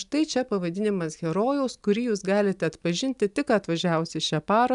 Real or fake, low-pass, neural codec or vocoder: real; 10.8 kHz; none